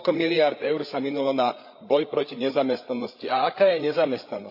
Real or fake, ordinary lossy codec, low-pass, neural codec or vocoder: fake; AAC, 48 kbps; 5.4 kHz; codec, 16 kHz, 8 kbps, FreqCodec, larger model